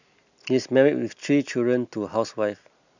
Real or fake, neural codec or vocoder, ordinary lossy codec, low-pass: real; none; none; 7.2 kHz